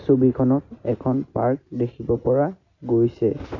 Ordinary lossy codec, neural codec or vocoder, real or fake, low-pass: none; none; real; 7.2 kHz